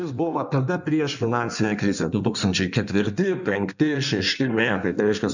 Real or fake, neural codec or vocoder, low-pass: fake; codec, 16 kHz in and 24 kHz out, 1.1 kbps, FireRedTTS-2 codec; 7.2 kHz